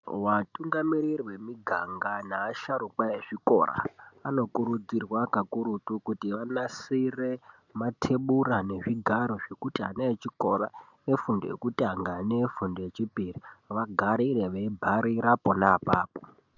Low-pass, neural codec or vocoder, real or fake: 7.2 kHz; none; real